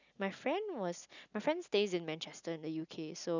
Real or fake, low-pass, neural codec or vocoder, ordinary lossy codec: real; 7.2 kHz; none; none